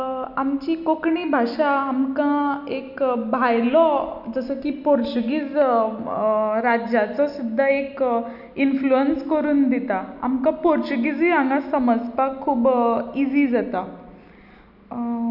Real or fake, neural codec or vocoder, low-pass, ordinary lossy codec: real; none; 5.4 kHz; none